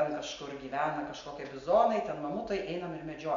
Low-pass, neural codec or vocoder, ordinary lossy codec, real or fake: 7.2 kHz; none; MP3, 64 kbps; real